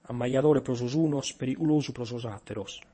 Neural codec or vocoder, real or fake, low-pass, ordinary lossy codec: vocoder, 22.05 kHz, 80 mel bands, WaveNeXt; fake; 9.9 kHz; MP3, 32 kbps